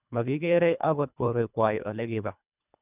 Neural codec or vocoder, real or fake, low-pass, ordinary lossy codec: codec, 24 kHz, 1.5 kbps, HILCodec; fake; 3.6 kHz; none